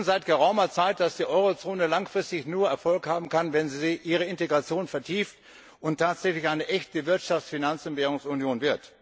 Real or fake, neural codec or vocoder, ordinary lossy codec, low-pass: real; none; none; none